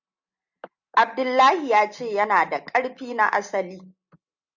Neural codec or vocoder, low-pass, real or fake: none; 7.2 kHz; real